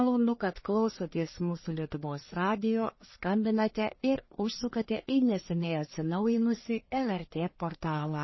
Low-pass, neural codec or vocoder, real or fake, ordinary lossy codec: 7.2 kHz; codec, 44.1 kHz, 1.7 kbps, Pupu-Codec; fake; MP3, 24 kbps